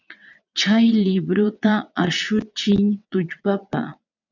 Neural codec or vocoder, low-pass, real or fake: vocoder, 22.05 kHz, 80 mel bands, WaveNeXt; 7.2 kHz; fake